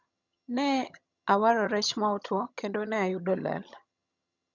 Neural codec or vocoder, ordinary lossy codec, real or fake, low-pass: vocoder, 22.05 kHz, 80 mel bands, HiFi-GAN; none; fake; 7.2 kHz